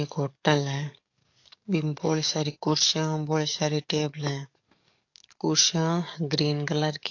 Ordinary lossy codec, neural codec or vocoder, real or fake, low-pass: AAC, 48 kbps; codec, 44.1 kHz, 7.8 kbps, DAC; fake; 7.2 kHz